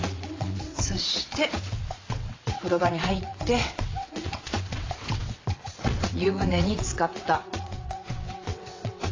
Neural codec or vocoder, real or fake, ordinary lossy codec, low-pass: vocoder, 22.05 kHz, 80 mel bands, WaveNeXt; fake; AAC, 32 kbps; 7.2 kHz